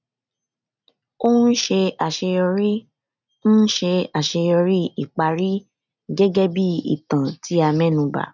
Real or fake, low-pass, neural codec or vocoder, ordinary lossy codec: real; 7.2 kHz; none; none